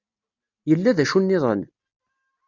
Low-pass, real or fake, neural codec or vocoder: 7.2 kHz; real; none